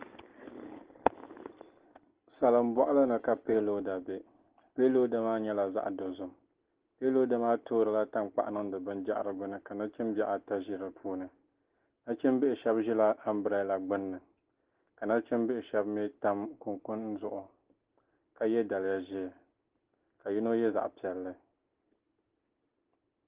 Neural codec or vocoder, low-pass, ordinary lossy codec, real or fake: none; 3.6 kHz; Opus, 16 kbps; real